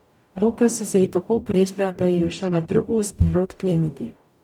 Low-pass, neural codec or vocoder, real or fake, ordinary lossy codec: 19.8 kHz; codec, 44.1 kHz, 0.9 kbps, DAC; fake; none